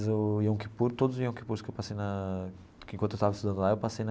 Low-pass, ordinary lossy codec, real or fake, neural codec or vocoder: none; none; real; none